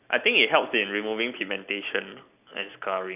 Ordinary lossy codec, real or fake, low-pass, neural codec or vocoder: none; real; 3.6 kHz; none